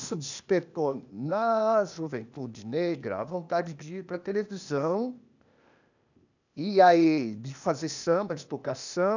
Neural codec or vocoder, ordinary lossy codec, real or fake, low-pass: codec, 16 kHz, 0.8 kbps, ZipCodec; none; fake; 7.2 kHz